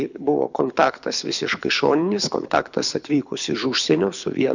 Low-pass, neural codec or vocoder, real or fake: 7.2 kHz; codec, 24 kHz, 6 kbps, HILCodec; fake